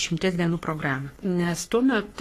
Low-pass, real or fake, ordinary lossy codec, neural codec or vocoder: 14.4 kHz; fake; AAC, 48 kbps; codec, 44.1 kHz, 3.4 kbps, Pupu-Codec